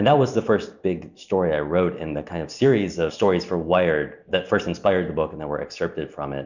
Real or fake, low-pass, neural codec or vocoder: real; 7.2 kHz; none